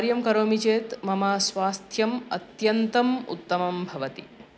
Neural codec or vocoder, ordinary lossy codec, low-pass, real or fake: none; none; none; real